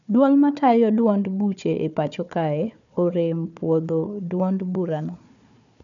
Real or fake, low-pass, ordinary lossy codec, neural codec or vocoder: fake; 7.2 kHz; none; codec, 16 kHz, 4 kbps, FunCodec, trained on Chinese and English, 50 frames a second